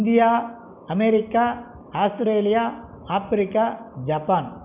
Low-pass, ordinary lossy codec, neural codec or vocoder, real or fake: 3.6 kHz; none; none; real